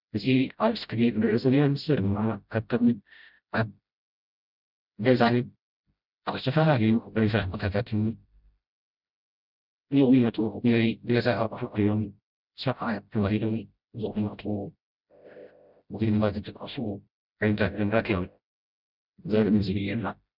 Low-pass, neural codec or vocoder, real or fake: 5.4 kHz; codec, 16 kHz, 0.5 kbps, FreqCodec, smaller model; fake